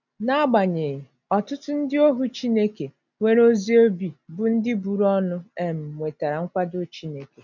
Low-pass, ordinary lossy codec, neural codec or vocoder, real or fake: 7.2 kHz; none; none; real